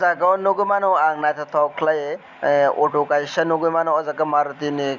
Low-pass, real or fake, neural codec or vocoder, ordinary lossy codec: 7.2 kHz; real; none; Opus, 64 kbps